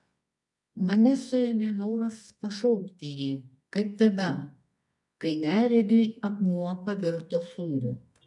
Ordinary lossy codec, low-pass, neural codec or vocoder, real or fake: MP3, 96 kbps; 10.8 kHz; codec, 24 kHz, 0.9 kbps, WavTokenizer, medium music audio release; fake